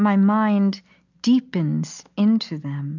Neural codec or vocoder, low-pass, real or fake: none; 7.2 kHz; real